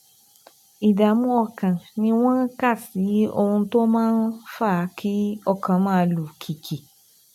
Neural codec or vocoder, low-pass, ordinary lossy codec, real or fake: none; 19.8 kHz; none; real